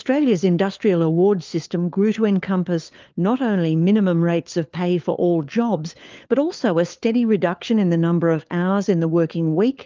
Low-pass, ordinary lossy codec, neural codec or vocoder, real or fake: 7.2 kHz; Opus, 24 kbps; codec, 16 kHz, 4 kbps, FunCodec, trained on LibriTTS, 50 frames a second; fake